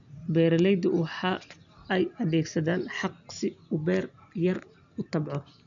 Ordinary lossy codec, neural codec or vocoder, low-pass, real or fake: none; none; 7.2 kHz; real